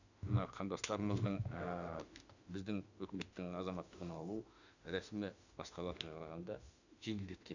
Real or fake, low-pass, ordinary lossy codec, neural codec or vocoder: fake; 7.2 kHz; none; autoencoder, 48 kHz, 32 numbers a frame, DAC-VAE, trained on Japanese speech